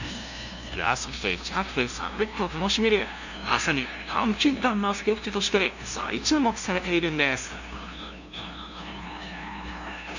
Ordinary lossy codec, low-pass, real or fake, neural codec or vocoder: none; 7.2 kHz; fake; codec, 16 kHz, 0.5 kbps, FunCodec, trained on LibriTTS, 25 frames a second